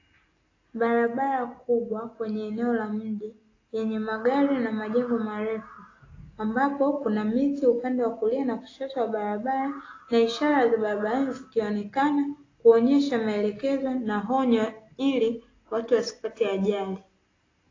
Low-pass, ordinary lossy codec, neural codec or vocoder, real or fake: 7.2 kHz; AAC, 32 kbps; none; real